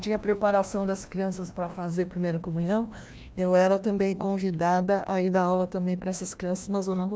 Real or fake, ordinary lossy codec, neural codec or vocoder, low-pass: fake; none; codec, 16 kHz, 1 kbps, FreqCodec, larger model; none